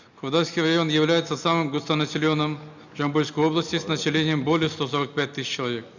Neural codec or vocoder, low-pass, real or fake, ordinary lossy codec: none; 7.2 kHz; real; none